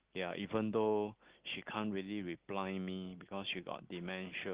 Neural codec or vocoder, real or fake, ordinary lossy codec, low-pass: none; real; Opus, 16 kbps; 3.6 kHz